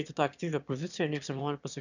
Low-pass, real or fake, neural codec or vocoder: 7.2 kHz; fake; autoencoder, 22.05 kHz, a latent of 192 numbers a frame, VITS, trained on one speaker